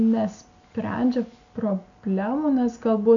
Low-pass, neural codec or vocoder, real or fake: 7.2 kHz; none; real